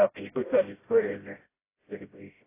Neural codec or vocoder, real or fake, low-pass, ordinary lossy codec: codec, 16 kHz, 0.5 kbps, FreqCodec, smaller model; fake; 3.6 kHz; AAC, 24 kbps